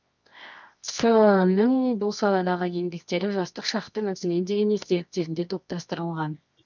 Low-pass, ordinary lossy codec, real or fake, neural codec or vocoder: 7.2 kHz; none; fake; codec, 24 kHz, 0.9 kbps, WavTokenizer, medium music audio release